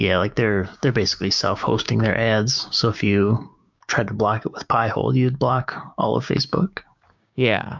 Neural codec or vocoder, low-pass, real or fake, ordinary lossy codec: codec, 16 kHz, 6 kbps, DAC; 7.2 kHz; fake; MP3, 64 kbps